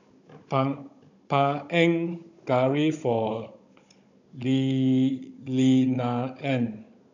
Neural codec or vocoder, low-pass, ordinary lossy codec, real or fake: codec, 16 kHz, 16 kbps, FunCodec, trained on Chinese and English, 50 frames a second; 7.2 kHz; none; fake